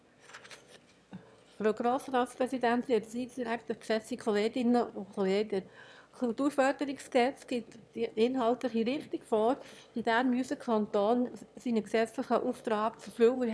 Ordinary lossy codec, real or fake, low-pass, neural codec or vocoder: none; fake; none; autoencoder, 22.05 kHz, a latent of 192 numbers a frame, VITS, trained on one speaker